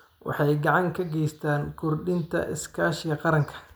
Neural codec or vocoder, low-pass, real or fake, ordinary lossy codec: none; none; real; none